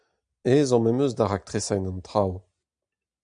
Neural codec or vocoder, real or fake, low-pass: none; real; 9.9 kHz